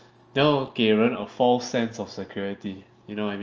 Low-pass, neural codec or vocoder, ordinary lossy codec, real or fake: 7.2 kHz; none; Opus, 24 kbps; real